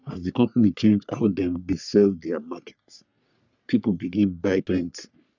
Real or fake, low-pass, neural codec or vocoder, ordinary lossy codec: fake; 7.2 kHz; codec, 44.1 kHz, 3.4 kbps, Pupu-Codec; none